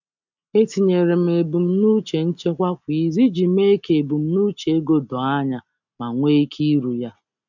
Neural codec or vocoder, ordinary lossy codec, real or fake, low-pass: none; none; real; 7.2 kHz